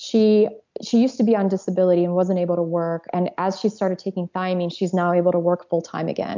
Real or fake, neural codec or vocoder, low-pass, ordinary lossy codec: real; none; 7.2 kHz; MP3, 64 kbps